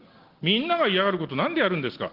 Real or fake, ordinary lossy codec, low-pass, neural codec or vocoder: real; Opus, 16 kbps; 5.4 kHz; none